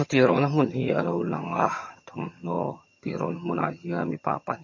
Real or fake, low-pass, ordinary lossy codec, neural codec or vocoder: fake; 7.2 kHz; MP3, 32 kbps; vocoder, 22.05 kHz, 80 mel bands, HiFi-GAN